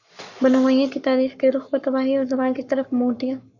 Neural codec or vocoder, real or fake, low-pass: codec, 44.1 kHz, 7.8 kbps, Pupu-Codec; fake; 7.2 kHz